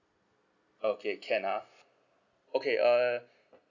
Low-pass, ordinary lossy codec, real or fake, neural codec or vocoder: 7.2 kHz; none; real; none